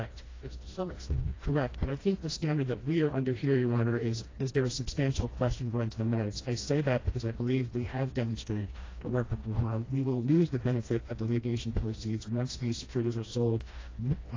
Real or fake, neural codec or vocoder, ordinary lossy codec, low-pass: fake; codec, 16 kHz, 1 kbps, FreqCodec, smaller model; AAC, 32 kbps; 7.2 kHz